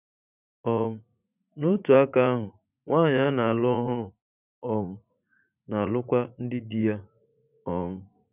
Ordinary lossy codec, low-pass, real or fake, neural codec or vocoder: none; 3.6 kHz; fake; vocoder, 44.1 kHz, 80 mel bands, Vocos